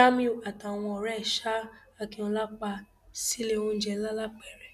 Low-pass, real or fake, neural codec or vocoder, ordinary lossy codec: 14.4 kHz; real; none; none